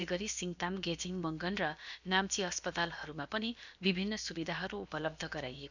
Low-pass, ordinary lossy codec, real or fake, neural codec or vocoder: 7.2 kHz; none; fake; codec, 16 kHz, about 1 kbps, DyCAST, with the encoder's durations